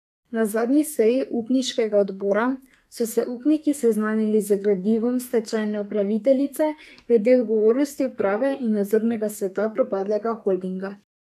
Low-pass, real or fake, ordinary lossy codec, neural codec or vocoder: 14.4 kHz; fake; none; codec, 32 kHz, 1.9 kbps, SNAC